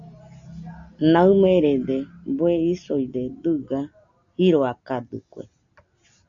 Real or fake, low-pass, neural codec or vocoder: real; 7.2 kHz; none